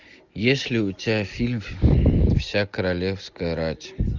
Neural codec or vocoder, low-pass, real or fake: none; 7.2 kHz; real